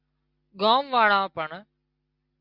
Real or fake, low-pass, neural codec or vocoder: real; 5.4 kHz; none